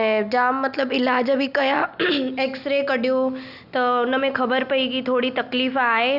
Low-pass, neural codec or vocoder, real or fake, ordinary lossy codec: 5.4 kHz; none; real; none